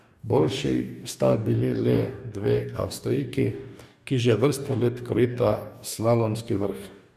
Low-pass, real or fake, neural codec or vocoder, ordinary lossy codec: 14.4 kHz; fake; codec, 44.1 kHz, 2.6 kbps, DAC; none